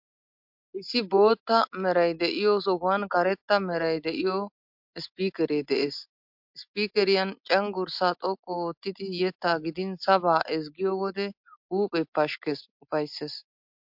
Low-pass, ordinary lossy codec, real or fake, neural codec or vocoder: 5.4 kHz; MP3, 48 kbps; real; none